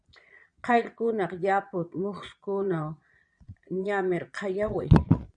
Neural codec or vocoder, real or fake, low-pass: vocoder, 22.05 kHz, 80 mel bands, Vocos; fake; 9.9 kHz